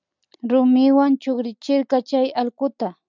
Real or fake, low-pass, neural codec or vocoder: real; 7.2 kHz; none